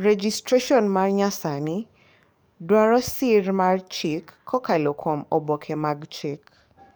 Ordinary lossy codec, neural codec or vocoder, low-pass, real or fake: none; codec, 44.1 kHz, 7.8 kbps, DAC; none; fake